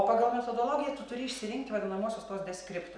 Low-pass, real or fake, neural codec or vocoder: 9.9 kHz; real; none